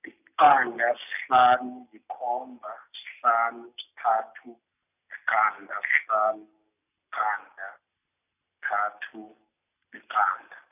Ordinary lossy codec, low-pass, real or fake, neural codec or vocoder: none; 3.6 kHz; real; none